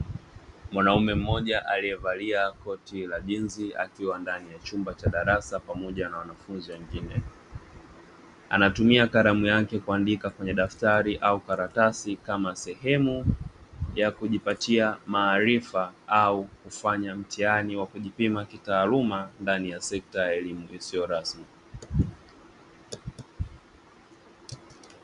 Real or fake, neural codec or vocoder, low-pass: real; none; 10.8 kHz